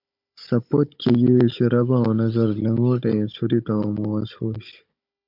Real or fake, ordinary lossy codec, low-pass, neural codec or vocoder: fake; MP3, 48 kbps; 5.4 kHz; codec, 16 kHz, 16 kbps, FunCodec, trained on Chinese and English, 50 frames a second